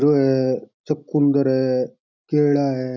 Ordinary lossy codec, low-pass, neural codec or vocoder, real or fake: none; 7.2 kHz; none; real